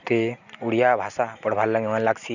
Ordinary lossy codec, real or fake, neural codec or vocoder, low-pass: none; real; none; 7.2 kHz